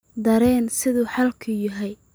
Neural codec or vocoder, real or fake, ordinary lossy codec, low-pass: none; real; none; none